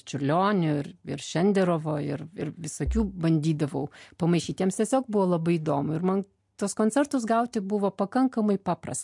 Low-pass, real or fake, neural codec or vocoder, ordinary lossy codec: 10.8 kHz; real; none; MP3, 64 kbps